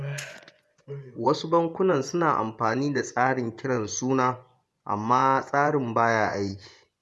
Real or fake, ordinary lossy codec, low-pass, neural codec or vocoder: fake; none; none; vocoder, 24 kHz, 100 mel bands, Vocos